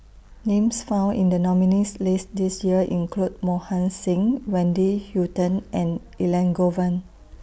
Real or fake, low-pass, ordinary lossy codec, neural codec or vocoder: real; none; none; none